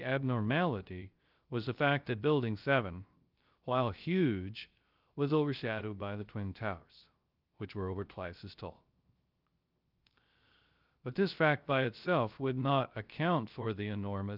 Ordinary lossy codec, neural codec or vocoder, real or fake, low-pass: Opus, 24 kbps; codec, 16 kHz, 0.3 kbps, FocalCodec; fake; 5.4 kHz